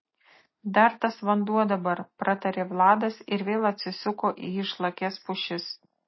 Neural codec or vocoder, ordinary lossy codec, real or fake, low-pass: none; MP3, 24 kbps; real; 7.2 kHz